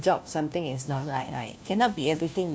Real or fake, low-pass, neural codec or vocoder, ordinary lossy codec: fake; none; codec, 16 kHz, 1 kbps, FunCodec, trained on LibriTTS, 50 frames a second; none